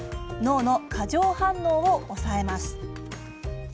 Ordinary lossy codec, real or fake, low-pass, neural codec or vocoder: none; real; none; none